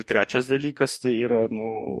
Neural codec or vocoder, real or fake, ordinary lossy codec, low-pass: codec, 44.1 kHz, 2.6 kbps, DAC; fake; MP3, 64 kbps; 14.4 kHz